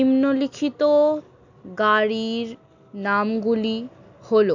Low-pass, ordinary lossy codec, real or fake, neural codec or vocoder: 7.2 kHz; none; real; none